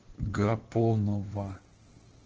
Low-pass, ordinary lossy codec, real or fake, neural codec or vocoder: 7.2 kHz; Opus, 16 kbps; fake; codec, 16 kHz in and 24 kHz out, 2.2 kbps, FireRedTTS-2 codec